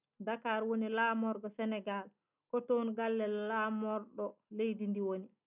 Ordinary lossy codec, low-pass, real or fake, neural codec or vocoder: none; 3.6 kHz; real; none